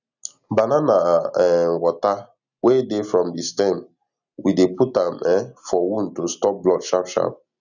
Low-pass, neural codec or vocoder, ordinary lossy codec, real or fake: 7.2 kHz; none; none; real